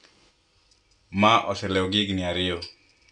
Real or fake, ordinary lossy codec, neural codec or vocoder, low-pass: real; none; none; 9.9 kHz